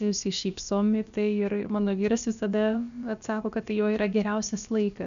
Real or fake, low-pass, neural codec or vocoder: fake; 7.2 kHz; codec, 16 kHz, about 1 kbps, DyCAST, with the encoder's durations